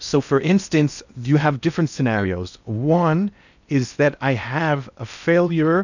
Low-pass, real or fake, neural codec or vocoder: 7.2 kHz; fake; codec, 16 kHz in and 24 kHz out, 0.6 kbps, FocalCodec, streaming, 4096 codes